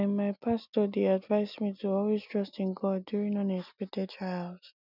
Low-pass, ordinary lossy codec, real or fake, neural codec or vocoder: 5.4 kHz; none; real; none